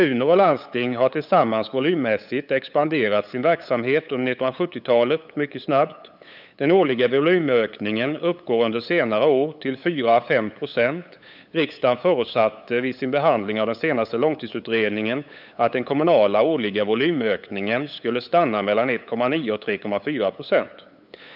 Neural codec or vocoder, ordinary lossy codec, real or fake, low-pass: codec, 16 kHz, 8 kbps, FunCodec, trained on LibriTTS, 25 frames a second; none; fake; 5.4 kHz